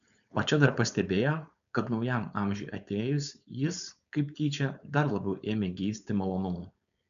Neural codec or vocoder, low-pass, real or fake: codec, 16 kHz, 4.8 kbps, FACodec; 7.2 kHz; fake